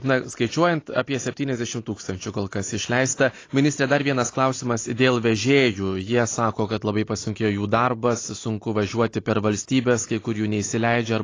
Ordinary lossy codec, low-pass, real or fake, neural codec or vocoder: AAC, 32 kbps; 7.2 kHz; real; none